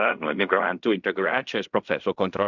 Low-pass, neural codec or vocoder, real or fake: 7.2 kHz; codec, 16 kHz in and 24 kHz out, 0.4 kbps, LongCat-Audio-Codec, fine tuned four codebook decoder; fake